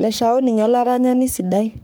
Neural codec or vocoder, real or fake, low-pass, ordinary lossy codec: codec, 44.1 kHz, 3.4 kbps, Pupu-Codec; fake; none; none